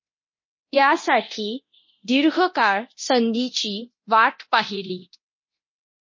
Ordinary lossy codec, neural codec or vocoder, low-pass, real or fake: MP3, 32 kbps; codec, 24 kHz, 0.9 kbps, DualCodec; 7.2 kHz; fake